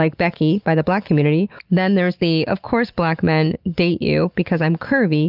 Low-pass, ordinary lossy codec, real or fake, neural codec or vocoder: 5.4 kHz; Opus, 32 kbps; real; none